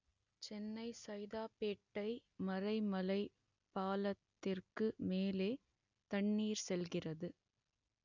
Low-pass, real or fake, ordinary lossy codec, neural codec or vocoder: 7.2 kHz; real; none; none